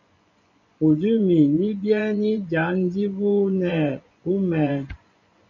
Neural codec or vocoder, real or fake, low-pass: vocoder, 24 kHz, 100 mel bands, Vocos; fake; 7.2 kHz